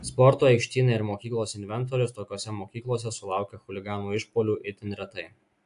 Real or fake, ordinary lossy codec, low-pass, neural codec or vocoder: real; AAC, 64 kbps; 10.8 kHz; none